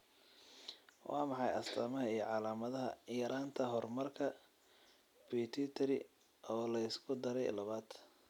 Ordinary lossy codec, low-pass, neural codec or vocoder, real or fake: none; 19.8 kHz; none; real